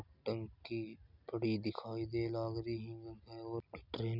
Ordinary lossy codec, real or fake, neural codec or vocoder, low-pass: none; real; none; 5.4 kHz